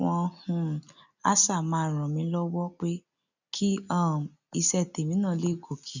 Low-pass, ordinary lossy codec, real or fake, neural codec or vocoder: 7.2 kHz; none; real; none